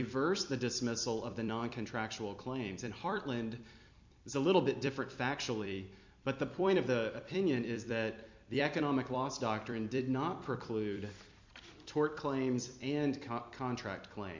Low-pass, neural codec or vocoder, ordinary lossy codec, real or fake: 7.2 kHz; vocoder, 44.1 kHz, 128 mel bands every 256 samples, BigVGAN v2; MP3, 64 kbps; fake